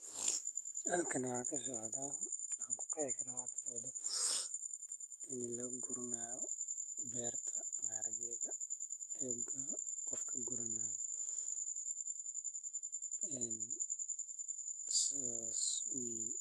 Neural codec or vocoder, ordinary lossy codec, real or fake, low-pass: autoencoder, 48 kHz, 128 numbers a frame, DAC-VAE, trained on Japanese speech; Opus, 32 kbps; fake; 14.4 kHz